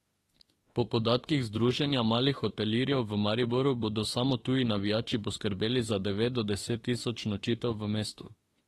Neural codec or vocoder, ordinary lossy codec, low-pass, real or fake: autoencoder, 48 kHz, 32 numbers a frame, DAC-VAE, trained on Japanese speech; AAC, 32 kbps; 19.8 kHz; fake